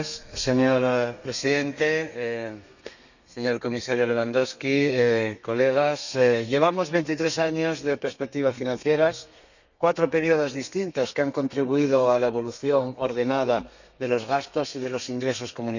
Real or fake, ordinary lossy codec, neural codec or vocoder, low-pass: fake; none; codec, 32 kHz, 1.9 kbps, SNAC; 7.2 kHz